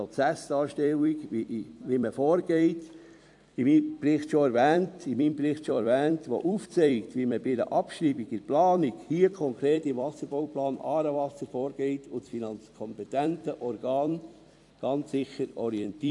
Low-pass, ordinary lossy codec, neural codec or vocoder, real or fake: 10.8 kHz; AAC, 64 kbps; vocoder, 44.1 kHz, 128 mel bands every 256 samples, BigVGAN v2; fake